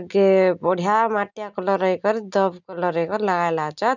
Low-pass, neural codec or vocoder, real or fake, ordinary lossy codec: 7.2 kHz; none; real; none